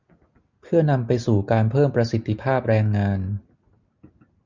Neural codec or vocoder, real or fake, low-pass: none; real; 7.2 kHz